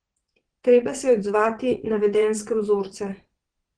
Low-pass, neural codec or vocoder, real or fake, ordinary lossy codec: 9.9 kHz; vocoder, 22.05 kHz, 80 mel bands, WaveNeXt; fake; Opus, 16 kbps